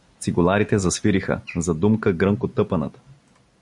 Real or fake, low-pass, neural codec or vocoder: real; 10.8 kHz; none